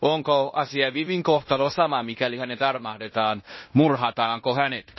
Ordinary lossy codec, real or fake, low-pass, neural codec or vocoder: MP3, 24 kbps; fake; 7.2 kHz; codec, 16 kHz in and 24 kHz out, 0.9 kbps, LongCat-Audio-Codec, fine tuned four codebook decoder